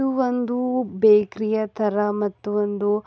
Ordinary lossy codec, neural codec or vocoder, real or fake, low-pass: none; none; real; none